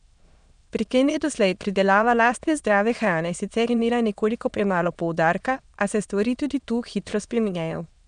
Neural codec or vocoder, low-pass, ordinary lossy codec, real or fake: autoencoder, 22.05 kHz, a latent of 192 numbers a frame, VITS, trained on many speakers; 9.9 kHz; none; fake